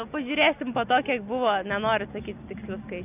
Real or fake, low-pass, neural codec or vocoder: real; 3.6 kHz; none